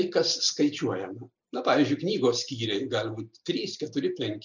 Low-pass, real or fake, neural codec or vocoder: 7.2 kHz; real; none